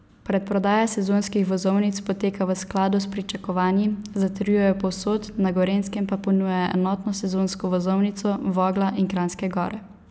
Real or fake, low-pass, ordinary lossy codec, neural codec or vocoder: real; none; none; none